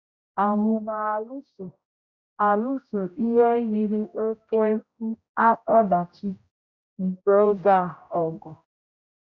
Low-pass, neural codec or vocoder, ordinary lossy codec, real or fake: 7.2 kHz; codec, 16 kHz, 0.5 kbps, X-Codec, HuBERT features, trained on general audio; Opus, 64 kbps; fake